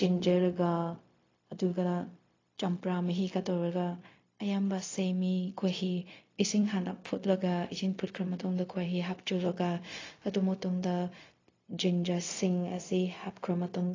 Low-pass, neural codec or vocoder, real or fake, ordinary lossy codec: 7.2 kHz; codec, 16 kHz, 0.4 kbps, LongCat-Audio-Codec; fake; AAC, 32 kbps